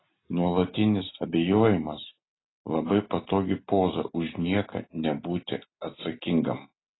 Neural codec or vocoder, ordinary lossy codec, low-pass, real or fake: none; AAC, 16 kbps; 7.2 kHz; real